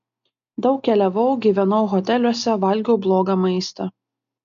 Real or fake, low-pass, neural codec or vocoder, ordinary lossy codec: real; 7.2 kHz; none; MP3, 96 kbps